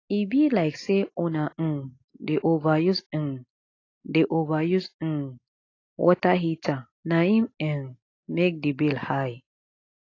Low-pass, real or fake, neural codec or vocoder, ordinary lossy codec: 7.2 kHz; real; none; AAC, 32 kbps